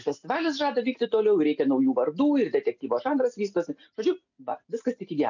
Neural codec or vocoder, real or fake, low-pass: none; real; 7.2 kHz